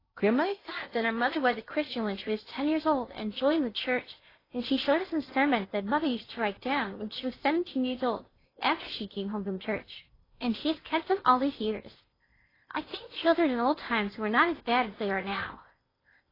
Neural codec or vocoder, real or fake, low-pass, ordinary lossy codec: codec, 16 kHz in and 24 kHz out, 0.6 kbps, FocalCodec, streaming, 2048 codes; fake; 5.4 kHz; AAC, 24 kbps